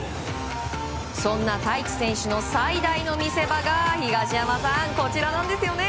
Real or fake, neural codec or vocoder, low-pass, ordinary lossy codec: real; none; none; none